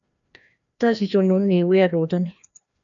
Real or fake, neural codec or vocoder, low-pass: fake; codec, 16 kHz, 1 kbps, FreqCodec, larger model; 7.2 kHz